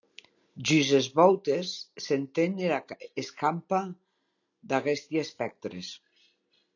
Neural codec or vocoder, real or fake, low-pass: none; real; 7.2 kHz